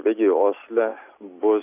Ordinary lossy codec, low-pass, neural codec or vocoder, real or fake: AAC, 24 kbps; 3.6 kHz; none; real